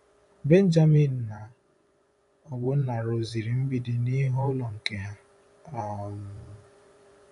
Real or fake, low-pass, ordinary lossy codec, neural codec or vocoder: fake; 10.8 kHz; none; vocoder, 24 kHz, 100 mel bands, Vocos